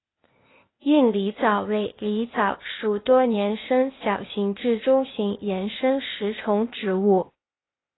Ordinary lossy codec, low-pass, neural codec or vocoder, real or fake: AAC, 16 kbps; 7.2 kHz; codec, 16 kHz, 0.8 kbps, ZipCodec; fake